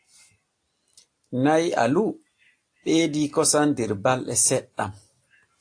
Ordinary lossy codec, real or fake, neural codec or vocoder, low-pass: AAC, 48 kbps; real; none; 9.9 kHz